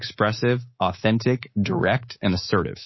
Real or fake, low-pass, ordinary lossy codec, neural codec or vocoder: fake; 7.2 kHz; MP3, 24 kbps; codec, 24 kHz, 3.1 kbps, DualCodec